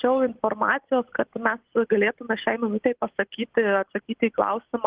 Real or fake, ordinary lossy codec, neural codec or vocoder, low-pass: real; Opus, 16 kbps; none; 3.6 kHz